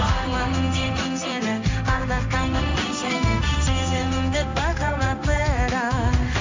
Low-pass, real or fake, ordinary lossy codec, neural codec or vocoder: 7.2 kHz; fake; MP3, 64 kbps; codec, 16 kHz in and 24 kHz out, 1 kbps, XY-Tokenizer